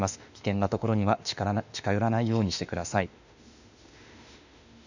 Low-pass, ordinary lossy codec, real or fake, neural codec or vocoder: 7.2 kHz; none; fake; autoencoder, 48 kHz, 32 numbers a frame, DAC-VAE, trained on Japanese speech